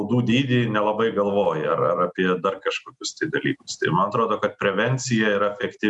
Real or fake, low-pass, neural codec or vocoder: real; 10.8 kHz; none